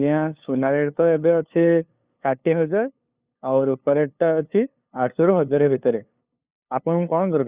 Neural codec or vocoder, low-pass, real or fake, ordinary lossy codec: codec, 16 kHz, 2 kbps, FunCodec, trained on LibriTTS, 25 frames a second; 3.6 kHz; fake; Opus, 64 kbps